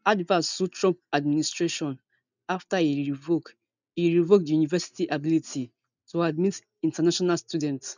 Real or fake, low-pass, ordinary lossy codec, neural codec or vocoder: real; 7.2 kHz; none; none